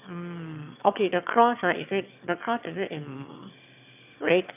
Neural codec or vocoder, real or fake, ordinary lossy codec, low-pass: autoencoder, 22.05 kHz, a latent of 192 numbers a frame, VITS, trained on one speaker; fake; none; 3.6 kHz